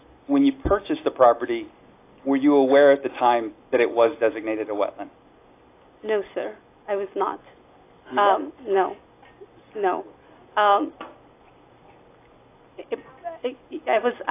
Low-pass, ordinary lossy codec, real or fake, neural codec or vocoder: 3.6 kHz; AAC, 24 kbps; real; none